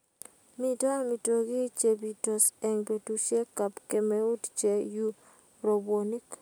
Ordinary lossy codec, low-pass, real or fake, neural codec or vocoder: none; none; real; none